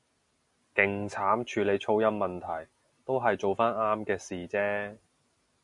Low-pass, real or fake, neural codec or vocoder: 10.8 kHz; real; none